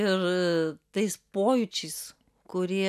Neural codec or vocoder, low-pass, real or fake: none; 14.4 kHz; real